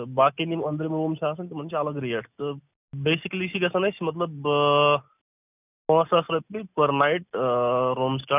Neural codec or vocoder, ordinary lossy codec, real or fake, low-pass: none; none; real; 3.6 kHz